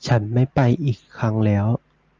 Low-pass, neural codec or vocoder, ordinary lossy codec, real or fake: 7.2 kHz; none; Opus, 24 kbps; real